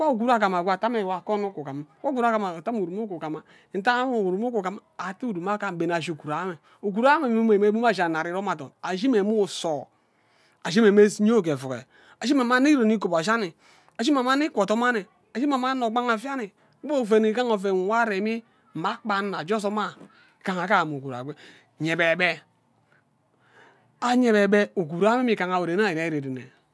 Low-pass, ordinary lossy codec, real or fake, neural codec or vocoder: none; none; real; none